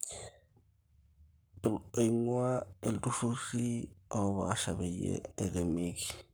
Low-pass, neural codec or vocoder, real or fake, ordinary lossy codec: none; codec, 44.1 kHz, 7.8 kbps, Pupu-Codec; fake; none